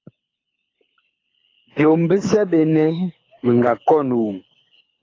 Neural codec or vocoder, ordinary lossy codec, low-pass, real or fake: codec, 24 kHz, 6 kbps, HILCodec; AAC, 32 kbps; 7.2 kHz; fake